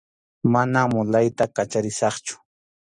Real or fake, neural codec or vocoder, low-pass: real; none; 10.8 kHz